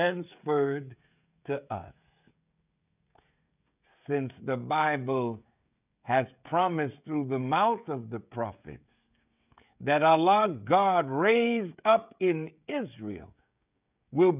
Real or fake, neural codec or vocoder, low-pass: fake; codec, 16 kHz, 16 kbps, FreqCodec, smaller model; 3.6 kHz